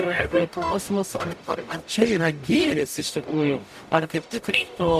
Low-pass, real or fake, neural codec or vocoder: 14.4 kHz; fake; codec, 44.1 kHz, 0.9 kbps, DAC